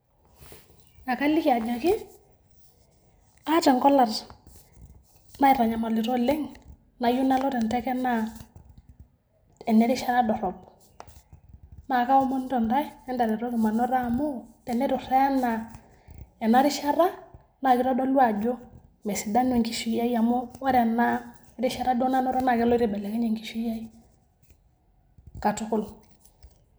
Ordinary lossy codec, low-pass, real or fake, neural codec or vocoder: none; none; real; none